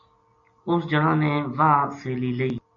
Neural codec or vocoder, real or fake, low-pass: none; real; 7.2 kHz